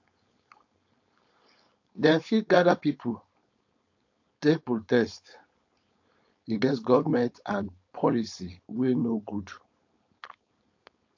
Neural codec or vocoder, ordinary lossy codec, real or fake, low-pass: codec, 16 kHz, 4.8 kbps, FACodec; AAC, 48 kbps; fake; 7.2 kHz